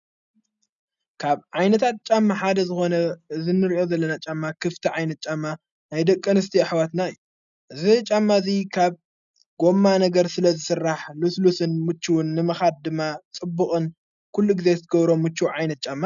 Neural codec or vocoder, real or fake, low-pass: none; real; 7.2 kHz